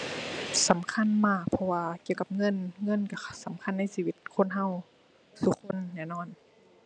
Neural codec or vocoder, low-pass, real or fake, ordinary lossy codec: none; 9.9 kHz; real; none